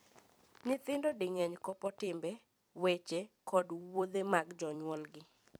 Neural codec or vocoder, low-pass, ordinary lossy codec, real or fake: vocoder, 44.1 kHz, 128 mel bands every 256 samples, BigVGAN v2; none; none; fake